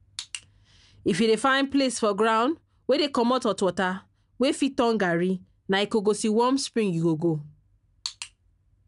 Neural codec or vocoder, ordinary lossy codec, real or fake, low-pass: none; none; real; 10.8 kHz